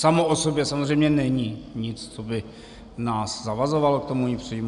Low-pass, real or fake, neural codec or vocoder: 10.8 kHz; real; none